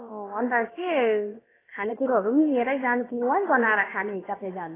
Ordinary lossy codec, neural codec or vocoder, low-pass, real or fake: AAC, 16 kbps; codec, 16 kHz, about 1 kbps, DyCAST, with the encoder's durations; 3.6 kHz; fake